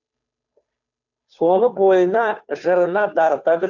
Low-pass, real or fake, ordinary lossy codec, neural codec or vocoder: 7.2 kHz; fake; none; codec, 16 kHz, 2 kbps, FunCodec, trained on Chinese and English, 25 frames a second